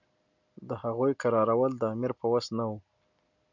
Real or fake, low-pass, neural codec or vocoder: real; 7.2 kHz; none